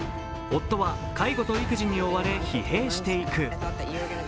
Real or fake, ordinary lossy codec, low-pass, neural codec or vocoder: real; none; none; none